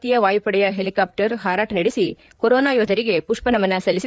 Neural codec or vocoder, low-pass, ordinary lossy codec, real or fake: codec, 16 kHz, 4 kbps, FreqCodec, larger model; none; none; fake